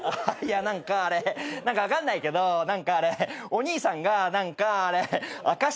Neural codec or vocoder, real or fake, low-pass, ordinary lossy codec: none; real; none; none